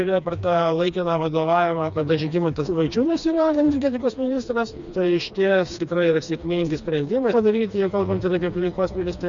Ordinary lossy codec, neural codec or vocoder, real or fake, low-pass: Opus, 64 kbps; codec, 16 kHz, 2 kbps, FreqCodec, smaller model; fake; 7.2 kHz